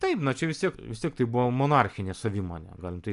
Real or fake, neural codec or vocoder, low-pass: real; none; 10.8 kHz